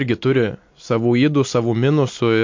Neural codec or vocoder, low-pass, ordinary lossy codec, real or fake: none; 7.2 kHz; MP3, 48 kbps; real